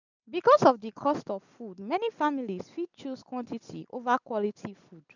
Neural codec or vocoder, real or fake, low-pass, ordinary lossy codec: none; real; 7.2 kHz; none